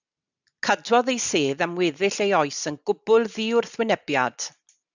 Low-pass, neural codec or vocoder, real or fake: 7.2 kHz; none; real